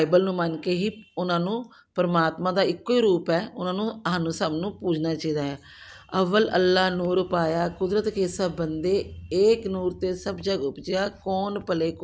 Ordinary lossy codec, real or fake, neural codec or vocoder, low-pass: none; real; none; none